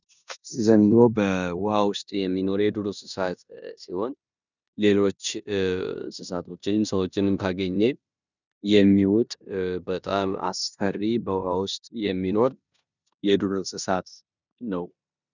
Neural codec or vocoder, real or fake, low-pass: codec, 16 kHz in and 24 kHz out, 0.9 kbps, LongCat-Audio-Codec, four codebook decoder; fake; 7.2 kHz